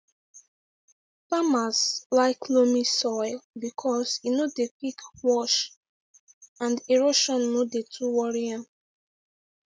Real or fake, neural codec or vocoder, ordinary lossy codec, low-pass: real; none; none; none